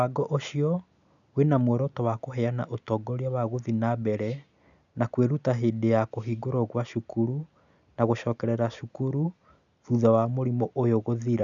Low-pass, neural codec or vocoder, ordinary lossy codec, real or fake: 7.2 kHz; none; none; real